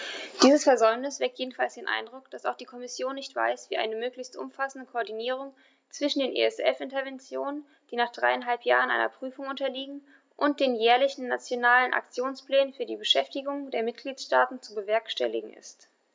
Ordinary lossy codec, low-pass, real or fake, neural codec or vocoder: none; none; real; none